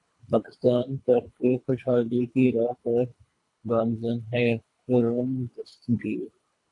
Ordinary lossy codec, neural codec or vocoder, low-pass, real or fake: AAC, 48 kbps; codec, 24 kHz, 3 kbps, HILCodec; 10.8 kHz; fake